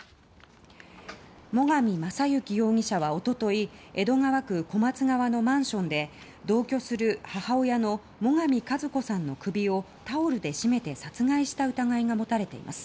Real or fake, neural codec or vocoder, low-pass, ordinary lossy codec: real; none; none; none